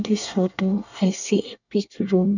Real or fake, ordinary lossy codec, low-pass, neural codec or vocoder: fake; none; 7.2 kHz; codec, 24 kHz, 1 kbps, SNAC